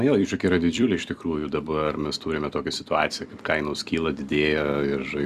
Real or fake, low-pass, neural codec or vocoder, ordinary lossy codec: fake; 14.4 kHz; vocoder, 44.1 kHz, 128 mel bands every 256 samples, BigVGAN v2; Opus, 64 kbps